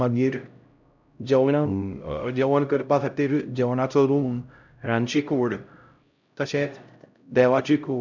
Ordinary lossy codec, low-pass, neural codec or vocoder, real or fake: none; 7.2 kHz; codec, 16 kHz, 0.5 kbps, X-Codec, HuBERT features, trained on LibriSpeech; fake